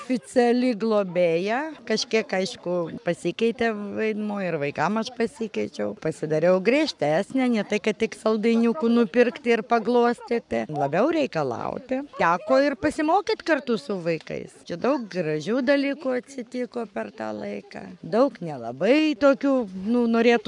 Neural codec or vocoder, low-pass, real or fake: codec, 44.1 kHz, 7.8 kbps, Pupu-Codec; 10.8 kHz; fake